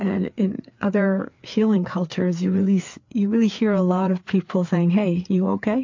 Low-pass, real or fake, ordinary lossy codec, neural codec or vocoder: 7.2 kHz; fake; MP3, 48 kbps; codec, 16 kHz, 4 kbps, FreqCodec, larger model